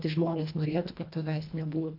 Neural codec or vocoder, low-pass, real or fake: codec, 24 kHz, 1.5 kbps, HILCodec; 5.4 kHz; fake